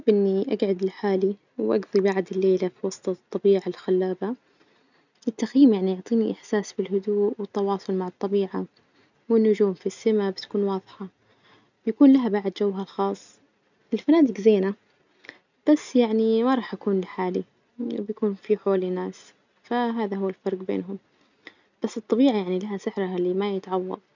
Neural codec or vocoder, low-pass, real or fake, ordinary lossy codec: none; 7.2 kHz; real; none